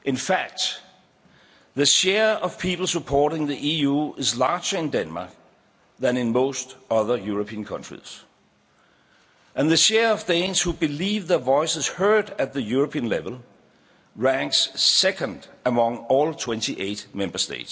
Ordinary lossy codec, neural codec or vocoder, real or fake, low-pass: none; none; real; none